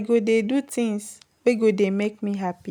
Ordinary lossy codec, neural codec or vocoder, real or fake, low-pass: none; none; real; 19.8 kHz